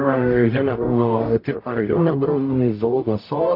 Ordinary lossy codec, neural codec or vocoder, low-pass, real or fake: AAC, 32 kbps; codec, 44.1 kHz, 0.9 kbps, DAC; 5.4 kHz; fake